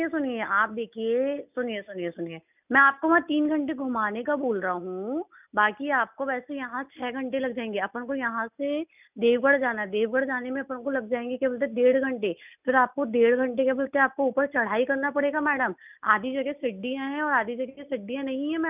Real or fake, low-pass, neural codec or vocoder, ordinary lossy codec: real; 3.6 kHz; none; none